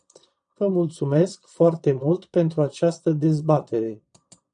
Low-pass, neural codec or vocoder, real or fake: 9.9 kHz; vocoder, 22.05 kHz, 80 mel bands, Vocos; fake